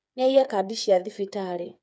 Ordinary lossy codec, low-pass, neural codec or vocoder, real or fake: none; none; codec, 16 kHz, 8 kbps, FreqCodec, smaller model; fake